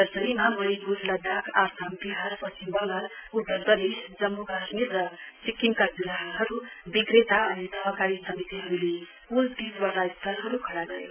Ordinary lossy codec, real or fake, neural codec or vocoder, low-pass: none; real; none; 3.6 kHz